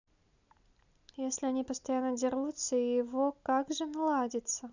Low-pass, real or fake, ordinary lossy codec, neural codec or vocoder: 7.2 kHz; real; none; none